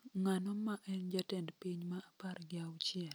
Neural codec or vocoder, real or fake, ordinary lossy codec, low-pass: none; real; none; none